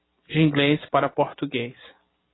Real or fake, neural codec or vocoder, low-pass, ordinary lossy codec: real; none; 7.2 kHz; AAC, 16 kbps